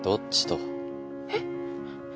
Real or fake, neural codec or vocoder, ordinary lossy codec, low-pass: real; none; none; none